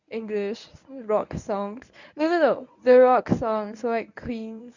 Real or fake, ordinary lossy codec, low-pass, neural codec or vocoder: fake; none; 7.2 kHz; codec, 24 kHz, 0.9 kbps, WavTokenizer, medium speech release version 1